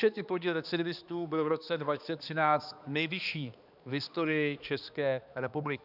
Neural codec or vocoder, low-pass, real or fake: codec, 16 kHz, 2 kbps, X-Codec, HuBERT features, trained on balanced general audio; 5.4 kHz; fake